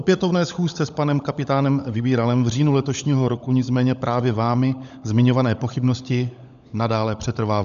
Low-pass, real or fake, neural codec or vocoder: 7.2 kHz; fake; codec, 16 kHz, 16 kbps, FunCodec, trained on LibriTTS, 50 frames a second